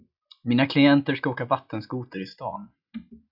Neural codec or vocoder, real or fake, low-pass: none; real; 5.4 kHz